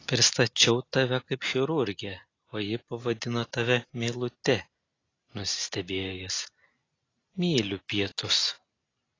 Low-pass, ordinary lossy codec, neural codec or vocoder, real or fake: 7.2 kHz; AAC, 32 kbps; none; real